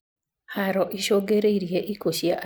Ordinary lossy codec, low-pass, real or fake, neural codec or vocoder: none; none; real; none